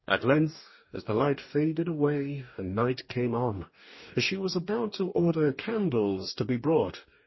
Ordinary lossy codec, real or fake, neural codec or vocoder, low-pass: MP3, 24 kbps; fake; codec, 44.1 kHz, 2.6 kbps, DAC; 7.2 kHz